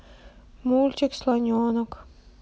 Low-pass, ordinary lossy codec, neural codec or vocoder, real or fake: none; none; none; real